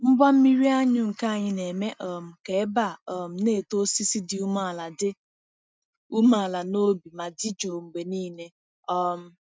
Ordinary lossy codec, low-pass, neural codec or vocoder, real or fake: none; none; none; real